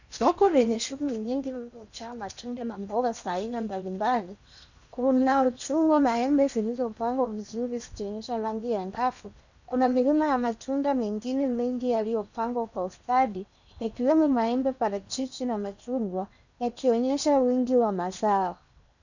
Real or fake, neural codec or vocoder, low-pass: fake; codec, 16 kHz in and 24 kHz out, 0.8 kbps, FocalCodec, streaming, 65536 codes; 7.2 kHz